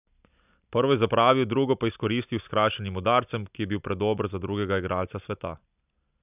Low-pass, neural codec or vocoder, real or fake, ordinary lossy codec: 3.6 kHz; none; real; none